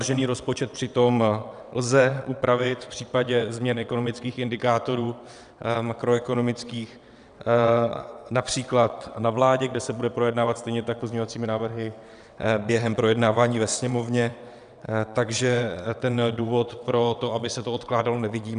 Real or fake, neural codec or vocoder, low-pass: fake; vocoder, 22.05 kHz, 80 mel bands, WaveNeXt; 9.9 kHz